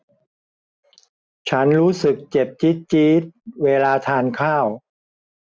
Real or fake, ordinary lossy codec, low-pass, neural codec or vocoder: real; none; none; none